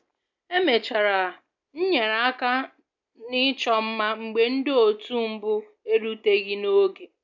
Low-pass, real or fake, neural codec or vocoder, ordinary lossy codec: 7.2 kHz; real; none; none